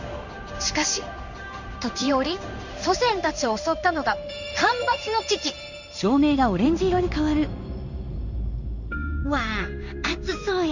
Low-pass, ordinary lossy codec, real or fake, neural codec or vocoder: 7.2 kHz; none; fake; codec, 16 kHz in and 24 kHz out, 1 kbps, XY-Tokenizer